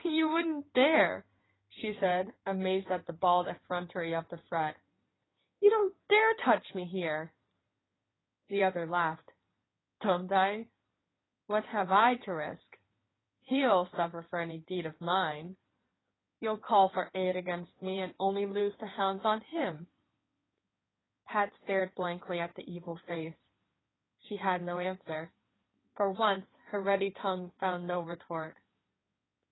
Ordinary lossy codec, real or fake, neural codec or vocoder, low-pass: AAC, 16 kbps; fake; codec, 16 kHz in and 24 kHz out, 2.2 kbps, FireRedTTS-2 codec; 7.2 kHz